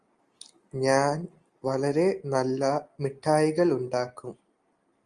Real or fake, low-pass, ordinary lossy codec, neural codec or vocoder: real; 9.9 kHz; Opus, 32 kbps; none